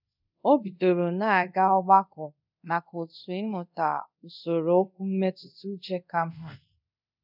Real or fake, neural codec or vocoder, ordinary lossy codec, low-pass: fake; codec, 24 kHz, 0.5 kbps, DualCodec; none; 5.4 kHz